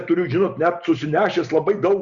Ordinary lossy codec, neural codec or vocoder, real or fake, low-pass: Opus, 64 kbps; none; real; 7.2 kHz